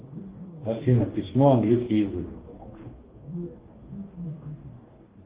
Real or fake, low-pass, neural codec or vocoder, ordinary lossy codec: fake; 3.6 kHz; codec, 44.1 kHz, 2.6 kbps, DAC; Opus, 16 kbps